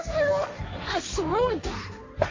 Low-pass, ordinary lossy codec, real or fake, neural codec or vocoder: 7.2 kHz; MP3, 48 kbps; fake; codec, 16 kHz, 1.1 kbps, Voila-Tokenizer